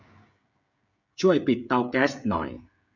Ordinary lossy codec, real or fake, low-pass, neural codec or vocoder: none; fake; 7.2 kHz; codec, 16 kHz, 16 kbps, FreqCodec, smaller model